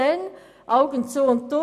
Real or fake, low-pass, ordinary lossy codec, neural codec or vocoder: real; 14.4 kHz; none; none